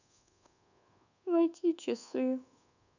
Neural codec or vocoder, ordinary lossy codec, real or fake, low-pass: codec, 24 kHz, 1.2 kbps, DualCodec; none; fake; 7.2 kHz